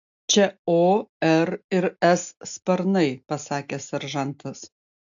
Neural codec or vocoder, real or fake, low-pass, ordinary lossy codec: none; real; 7.2 kHz; AAC, 64 kbps